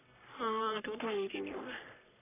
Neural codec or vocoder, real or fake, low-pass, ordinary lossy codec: codec, 44.1 kHz, 3.4 kbps, Pupu-Codec; fake; 3.6 kHz; none